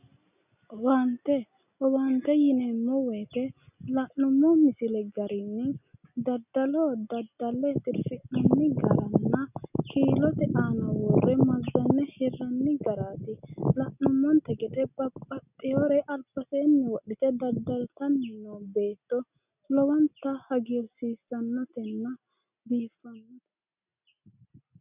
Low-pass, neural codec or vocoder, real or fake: 3.6 kHz; none; real